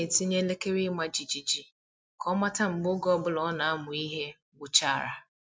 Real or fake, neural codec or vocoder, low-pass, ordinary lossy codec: real; none; none; none